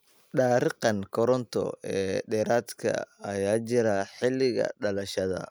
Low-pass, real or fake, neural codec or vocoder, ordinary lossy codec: none; real; none; none